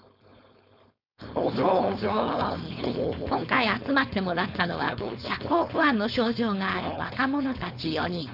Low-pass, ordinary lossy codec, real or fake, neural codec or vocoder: 5.4 kHz; none; fake; codec, 16 kHz, 4.8 kbps, FACodec